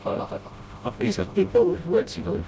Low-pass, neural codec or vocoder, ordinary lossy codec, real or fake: none; codec, 16 kHz, 0.5 kbps, FreqCodec, smaller model; none; fake